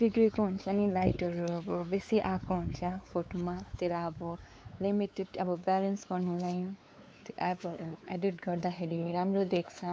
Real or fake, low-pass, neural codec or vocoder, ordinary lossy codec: fake; none; codec, 16 kHz, 4 kbps, X-Codec, WavLM features, trained on Multilingual LibriSpeech; none